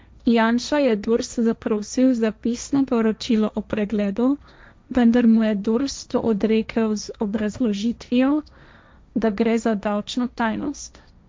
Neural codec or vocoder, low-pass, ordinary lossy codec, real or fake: codec, 16 kHz, 1.1 kbps, Voila-Tokenizer; none; none; fake